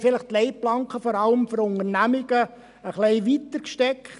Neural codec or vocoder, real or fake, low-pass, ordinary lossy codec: none; real; 10.8 kHz; none